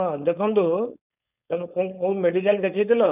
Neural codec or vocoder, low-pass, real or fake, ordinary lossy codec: codec, 16 kHz, 4.8 kbps, FACodec; 3.6 kHz; fake; none